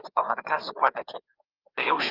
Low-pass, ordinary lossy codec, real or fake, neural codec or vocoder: 5.4 kHz; Opus, 32 kbps; fake; codec, 16 kHz, 4 kbps, FreqCodec, larger model